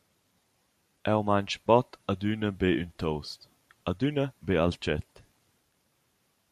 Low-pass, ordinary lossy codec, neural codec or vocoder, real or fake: 14.4 kHz; MP3, 96 kbps; vocoder, 44.1 kHz, 128 mel bands every 512 samples, BigVGAN v2; fake